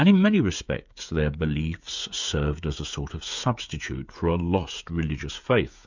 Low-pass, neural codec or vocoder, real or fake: 7.2 kHz; codec, 16 kHz, 16 kbps, FreqCodec, smaller model; fake